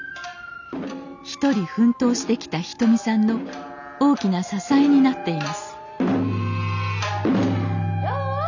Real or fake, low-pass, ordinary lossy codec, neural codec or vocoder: real; 7.2 kHz; none; none